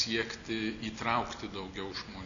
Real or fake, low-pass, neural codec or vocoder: real; 7.2 kHz; none